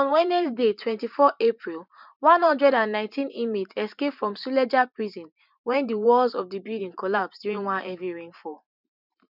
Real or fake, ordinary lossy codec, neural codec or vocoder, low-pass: fake; none; vocoder, 44.1 kHz, 128 mel bands every 512 samples, BigVGAN v2; 5.4 kHz